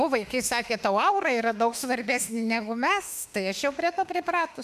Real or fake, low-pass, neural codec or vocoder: fake; 14.4 kHz; autoencoder, 48 kHz, 32 numbers a frame, DAC-VAE, trained on Japanese speech